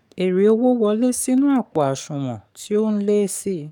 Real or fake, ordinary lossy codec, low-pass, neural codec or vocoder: fake; none; 19.8 kHz; codec, 44.1 kHz, 7.8 kbps, DAC